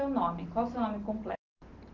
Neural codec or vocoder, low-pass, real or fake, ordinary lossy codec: none; 7.2 kHz; real; Opus, 16 kbps